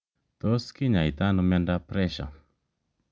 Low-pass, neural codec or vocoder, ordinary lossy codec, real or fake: none; none; none; real